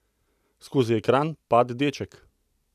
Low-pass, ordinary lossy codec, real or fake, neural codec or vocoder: 14.4 kHz; none; real; none